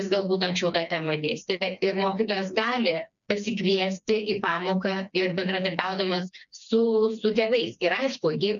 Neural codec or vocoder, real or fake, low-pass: codec, 16 kHz, 2 kbps, FreqCodec, smaller model; fake; 7.2 kHz